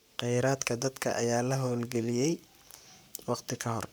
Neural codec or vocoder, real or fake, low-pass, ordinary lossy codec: codec, 44.1 kHz, 7.8 kbps, Pupu-Codec; fake; none; none